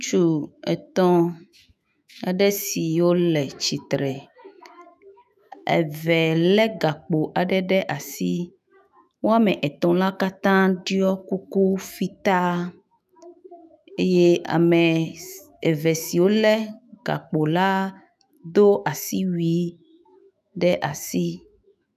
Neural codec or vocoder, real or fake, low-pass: autoencoder, 48 kHz, 128 numbers a frame, DAC-VAE, trained on Japanese speech; fake; 14.4 kHz